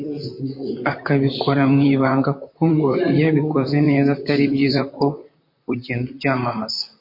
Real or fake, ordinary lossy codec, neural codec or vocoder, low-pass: fake; MP3, 32 kbps; vocoder, 44.1 kHz, 128 mel bands, Pupu-Vocoder; 5.4 kHz